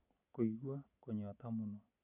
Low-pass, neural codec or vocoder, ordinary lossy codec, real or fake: 3.6 kHz; none; none; real